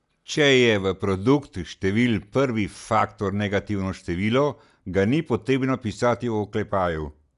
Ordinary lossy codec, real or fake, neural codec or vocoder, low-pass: none; real; none; 10.8 kHz